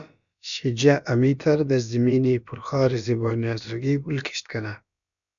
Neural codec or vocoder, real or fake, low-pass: codec, 16 kHz, about 1 kbps, DyCAST, with the encoder's durations; fake; 7.2 kHz